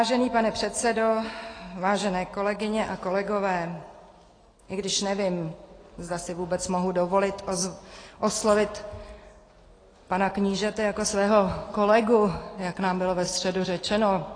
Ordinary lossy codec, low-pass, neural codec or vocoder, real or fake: AAC, 32 kbps; 9.9 kHz; none; real